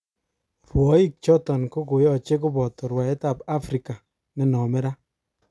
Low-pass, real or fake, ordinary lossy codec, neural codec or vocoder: none; real; none; none